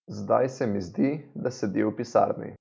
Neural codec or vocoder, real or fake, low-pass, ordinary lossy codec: none; real; none; none